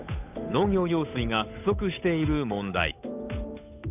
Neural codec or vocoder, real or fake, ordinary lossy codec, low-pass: codec, 16 kHz, 8 kbps, FunCodec, trained on Chinese and English, 25 frames a second; fake; none; 3.6 kHz